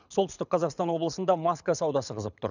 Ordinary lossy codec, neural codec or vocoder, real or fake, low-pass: none; codec, 24 kHz, 6 kbps, HILCodec; fake; 7.2 kHz